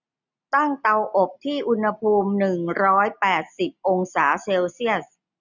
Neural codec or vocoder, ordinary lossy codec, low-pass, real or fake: none; none; 7.2 kHz; real